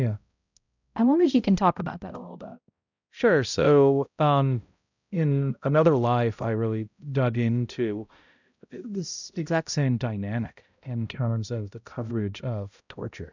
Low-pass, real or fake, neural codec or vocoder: 7.2 kHz; fake; codec, 16 kHz, 0.5 kbps, X-Codec, HuBERT features, trained on balanced general audio